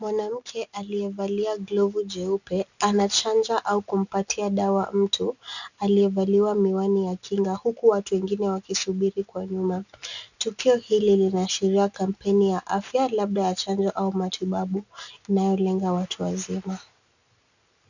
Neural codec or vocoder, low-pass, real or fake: none; 7.2 kHz; real